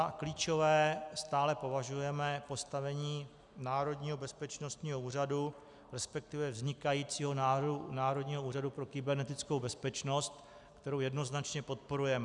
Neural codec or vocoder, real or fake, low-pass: none; real; 10.8 kHz